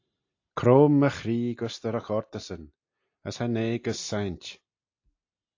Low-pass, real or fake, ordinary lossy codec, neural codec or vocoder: 7.2 kHz; real; AAC, 48 kbps; none